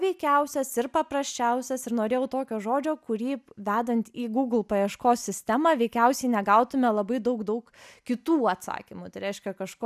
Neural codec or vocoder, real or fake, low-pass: none; real; 14.4 kHz